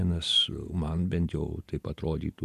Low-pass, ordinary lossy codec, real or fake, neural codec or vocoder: 14.4 kHz; AAC, 96 kbps; fake; vocoder, 48 kHz, 128 mel bands, Vocos